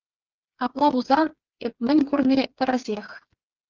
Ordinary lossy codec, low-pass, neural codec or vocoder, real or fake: Opus, 24 kbps; 7.2 kHz; codec, 16 kHz, 4 kbps, FreqCodec, smaller model; fake